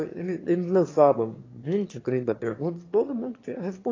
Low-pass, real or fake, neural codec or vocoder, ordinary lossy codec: 7.2 kHz; fake; autoencoder, 22.05 kHz, a latent of 192 numbers a frame, VITS, trained on one speaker; AAC, 32 kbps